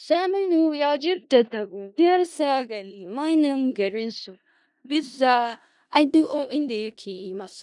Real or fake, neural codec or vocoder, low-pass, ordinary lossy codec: fake; codec, 16 kHz in and 24 kHz out, 0.4 kbps, LongCat-Audio-Codec, four codebook decoder; 10.8 kHz; none